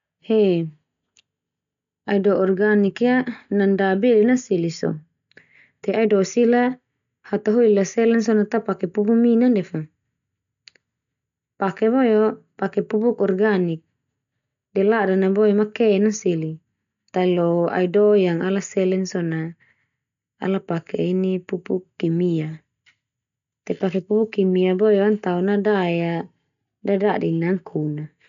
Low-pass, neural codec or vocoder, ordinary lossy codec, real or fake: 7.2 kHz; none; none; real